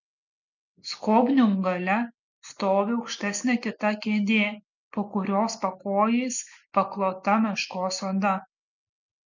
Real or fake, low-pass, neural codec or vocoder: real; 7.2 kHz; none